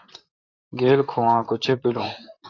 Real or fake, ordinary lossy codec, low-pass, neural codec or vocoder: fake; AAC, 48 kbps; 7.2 kHz; vocoder, 44.1 kHz, 128 mel bands, Pupu-Vocoder